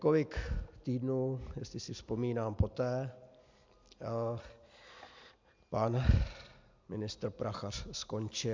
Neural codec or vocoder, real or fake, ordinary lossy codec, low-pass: none; real; MP3, 64 kbps; 7.2 kHz